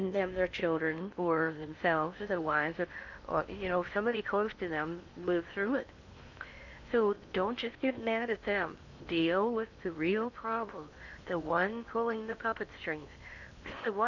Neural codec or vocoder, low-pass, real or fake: codec, 16 kHz in and 24 kHz out, 0.8 kbps, FocalCodec, streaming, 65536 codes; 7.2 kHz; fake